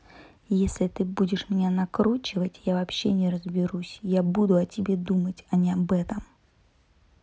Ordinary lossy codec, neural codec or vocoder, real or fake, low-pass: none; none; real; none